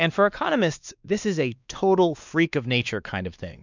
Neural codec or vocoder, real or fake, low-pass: codec, 16 kHz, 2 kbps, X-Codec, WavLM features, trained on Multilingual LibriSpeech; fake; 7.2 kHz